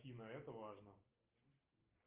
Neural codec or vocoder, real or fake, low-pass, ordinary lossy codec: none; real; 3.6 kHz; Opus, 24 kbps